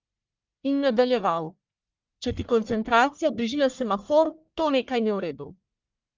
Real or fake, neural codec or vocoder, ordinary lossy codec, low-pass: fake; codec, 44.1 kHz, 1.7 kbps, Pupu-Codec; Opus, 24 kbps; 7.2 kHz